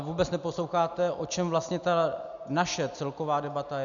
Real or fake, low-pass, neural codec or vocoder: real; 7.2 kHz; none